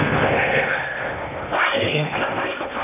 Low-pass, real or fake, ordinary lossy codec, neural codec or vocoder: 3.6 kHz; fake; none; codec, 16 kHz in and 24 kHz out, 0.8 kbps, FocalCodec, streaming, 65536 codes